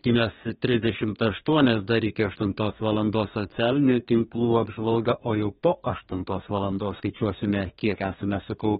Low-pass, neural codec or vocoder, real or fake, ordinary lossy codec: 14.4 kHz; codec, 32 kHz, 1.9 kbps, SNAC; fake; AAC, 16 kbps